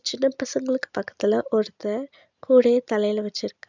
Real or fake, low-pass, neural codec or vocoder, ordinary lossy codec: real; 7.2 kHz; none; none